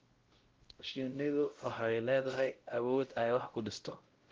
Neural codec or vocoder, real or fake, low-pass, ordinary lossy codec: codec, 16 kHz, 0.5 kbps, X-Codec, WavLM features, trained on Multilingual LibriSpeech; fake; 7.2 kHz; Opus, 16 kbps